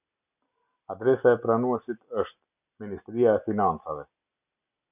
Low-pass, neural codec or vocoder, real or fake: 3.6 kHz; none; real